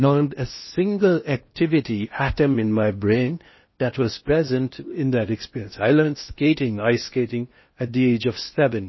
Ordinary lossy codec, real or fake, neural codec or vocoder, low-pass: MP3, 24 kbps; fake; codec, 16 kHz in and 24 kHz out, 0.8 kbps, FocalCodec, streaming, 65536 codes; 7.2 kHz